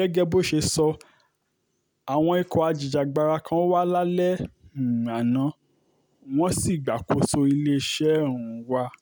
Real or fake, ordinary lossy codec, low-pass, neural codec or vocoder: real; none; none; none